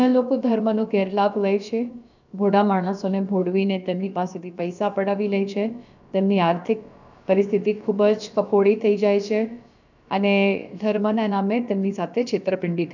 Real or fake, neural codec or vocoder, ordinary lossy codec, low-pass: fake; codec, 16 kHz, about 1 kbps, DyCAST, with the encoder's durations; none; 7.2 kHz